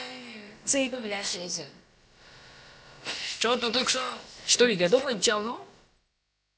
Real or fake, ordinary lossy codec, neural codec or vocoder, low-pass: fake; none; codec, 16 kHz, about 1 kbps, DyCAST, with the encoder's durations; none